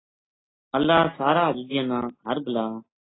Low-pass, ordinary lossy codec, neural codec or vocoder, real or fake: 7.2 kHz; AAC, 16 kbps; none; real